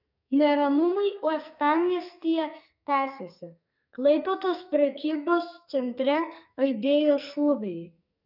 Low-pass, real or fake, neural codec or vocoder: 5.4 kHz; fake; codec, 44.1 kHz, 2.6 kbps, SNAC